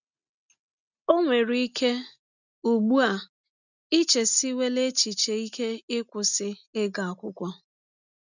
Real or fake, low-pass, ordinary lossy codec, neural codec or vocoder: real; 7.2 kHz; none; none